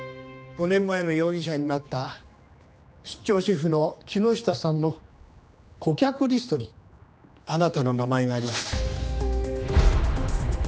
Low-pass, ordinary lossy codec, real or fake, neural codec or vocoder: none; none; fake; codec, 16 kHz, 2 kbps, X-Codec, HuBERT features, trained on general audio